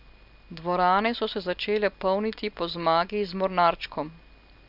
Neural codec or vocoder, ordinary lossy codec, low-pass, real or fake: none; none; 5.4 kHz; real